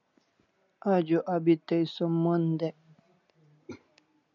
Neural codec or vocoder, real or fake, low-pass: none; real; 7.2 kHz